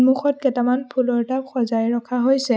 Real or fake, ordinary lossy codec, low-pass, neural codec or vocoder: real; none; none; none